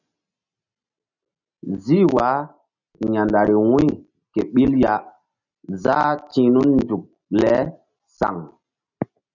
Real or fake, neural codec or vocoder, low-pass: real; none; 7.2 kHz